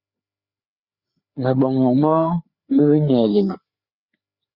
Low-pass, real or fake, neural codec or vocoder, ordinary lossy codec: 5.4 kHz; fake; codec, 16 kHz, 4 kbps, FreqCodec, larger model; Opus, 64 kbps